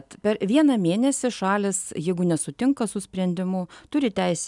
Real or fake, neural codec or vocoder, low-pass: real; none; 10.8 kHz